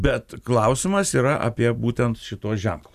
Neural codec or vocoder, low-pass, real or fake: none; 14.4 kHz; real